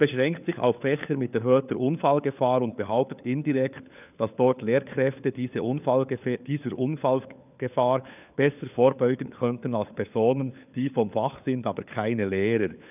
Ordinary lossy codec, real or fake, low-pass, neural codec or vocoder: none; fake; 3.6 kHz; codec, 16 kHz, 4 kbps, FunCodec, trained on LibriTTS, 50 frames a second